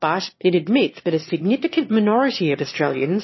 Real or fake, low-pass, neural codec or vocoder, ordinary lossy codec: fake; 7.2 kHz; autoencoder, 22.05 kHz, a latent of 192 numbers a frame, VITS, trained on one speaker; MP3, 24 kbps